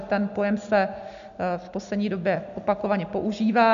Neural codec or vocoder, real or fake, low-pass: none; real; 7.2 kHz